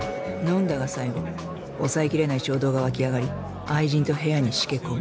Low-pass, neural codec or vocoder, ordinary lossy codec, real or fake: none; none; none; real